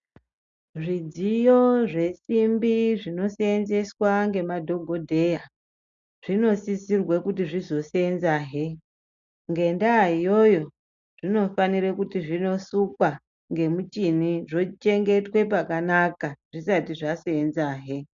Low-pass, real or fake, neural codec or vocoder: 7.2 kHz; real; none